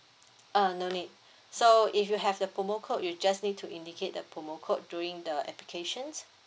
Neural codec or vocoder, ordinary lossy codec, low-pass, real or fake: none; none; none; real